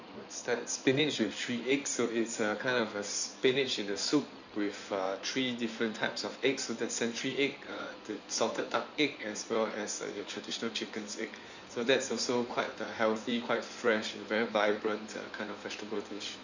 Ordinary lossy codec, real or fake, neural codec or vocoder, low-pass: none; fake; codec, 16 kHz in and 24 kHz out, 2.2 kbps, FireRedTTS-2 codec; 7.2 kHz